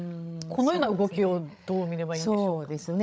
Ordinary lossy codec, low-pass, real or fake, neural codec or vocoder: none; none; fake; codec, 16 kHz, 16 kbps, FreqCodec, larger model